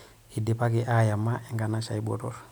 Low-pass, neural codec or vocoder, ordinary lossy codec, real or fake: none; none; none; real